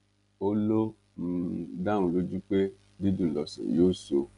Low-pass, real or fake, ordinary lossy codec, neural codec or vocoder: 10.8 kHz; real; none; none